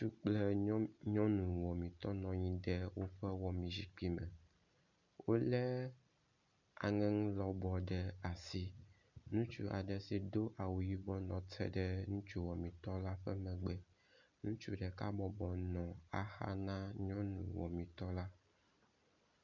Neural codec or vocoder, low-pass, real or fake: none; 7.2 kHz; real